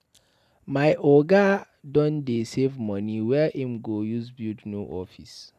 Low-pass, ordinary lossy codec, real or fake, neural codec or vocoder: 14.4 kHz; none; real; none